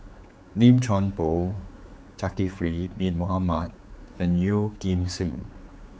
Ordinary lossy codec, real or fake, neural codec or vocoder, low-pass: none; fake; codec, 16 kHz, 4 kbps, X-Codec, HuBERT features, trained on general audio; none